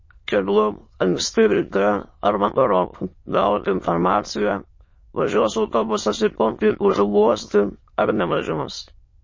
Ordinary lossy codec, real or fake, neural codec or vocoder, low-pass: MP3, 32 kbps; fake; autoencoder, 22.05 kHz, a latent of 192 numbers a frame, VITS, trained on many speakers; 7.2 kHz